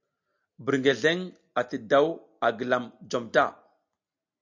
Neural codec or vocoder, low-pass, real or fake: none; 7.2 kHz; real